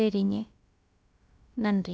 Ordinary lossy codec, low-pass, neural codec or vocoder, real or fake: none; none; codec, 16 kHz, about 1 kbps, DyCAST, with the encoder's durations; fake